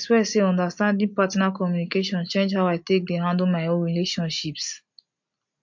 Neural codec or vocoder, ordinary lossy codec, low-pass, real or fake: none; MP3, 48 kbps; 7.2 kHz; real